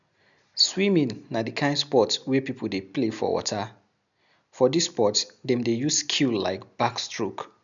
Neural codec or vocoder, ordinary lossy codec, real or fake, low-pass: none; none; real; 7.2 kHz